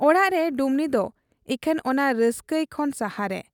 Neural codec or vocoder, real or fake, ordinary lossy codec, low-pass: none; real; none; 19.8 kHz